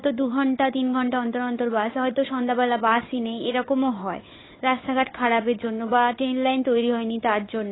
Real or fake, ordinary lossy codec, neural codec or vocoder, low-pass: fake; AAC, 16 kbps; codec, 16 kHz, 4 kbps, FunCodec, trained on Chinese and English, 50 frames a second; 7.2 kHz